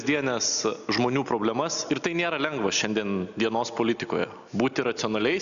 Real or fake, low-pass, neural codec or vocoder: real; 7.2 kHz; none